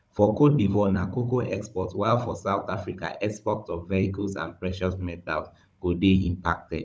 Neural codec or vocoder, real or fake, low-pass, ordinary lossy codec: codec, 16 kHz, 16 kbps, FunCodec, trained on Chinese and English, 50 frames a second; fake; none; none